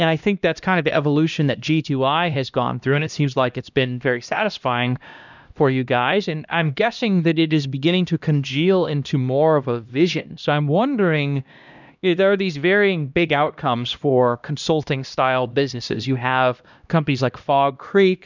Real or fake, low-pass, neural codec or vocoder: fake; 7.2 kHz; codec, 16 kHz, 1 kbps, X-Codec, HuBERT features, trained on LibriSpeech